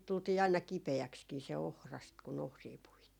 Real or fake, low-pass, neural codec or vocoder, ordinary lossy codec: real; 19.8 kHz; none; none